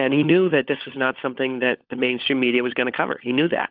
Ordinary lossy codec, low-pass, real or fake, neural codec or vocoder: Opus, 24 kbps; 5.4 kHz; fake; codec, 16 kHz, 16 kbps, FunCodec, trained on LibriTTS, 50 frames a second